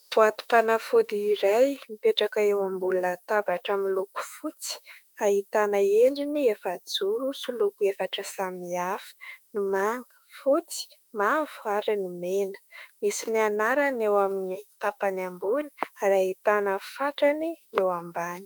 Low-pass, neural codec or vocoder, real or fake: 19.8 kHz; autoencoder, 48 kHz, 32 numbers a frame, DAC-VAE, trained on Japanese speech; fake